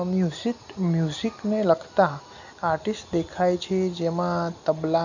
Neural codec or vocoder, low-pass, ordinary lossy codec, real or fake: none; 7.2 kHz; none; real